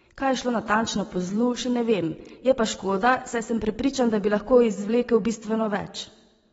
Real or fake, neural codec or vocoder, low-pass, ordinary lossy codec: fake; vocoder, 22.05 kHz, 80 mel bands, WaveNeXt; 9.9 kHz; AAC, 24 kbps